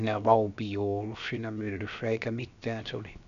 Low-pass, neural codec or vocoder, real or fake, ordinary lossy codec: 7.2 kHz; codec, 16 kHz, 0.7 kbps, FocalCodec; fake; none